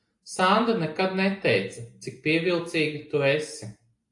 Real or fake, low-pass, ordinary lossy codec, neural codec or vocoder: real; 10.8 kHz; AAC, 64 kbps; none